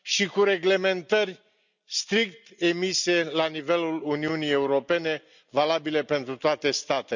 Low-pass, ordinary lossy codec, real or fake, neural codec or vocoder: 7.2 kHz; none; real; none